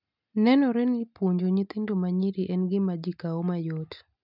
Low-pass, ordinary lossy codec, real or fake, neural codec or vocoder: 5.4 kHz; none; real; none